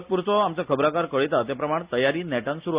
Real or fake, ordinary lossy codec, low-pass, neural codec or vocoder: real; Opus, 64 kbps; 3.6 kHz; none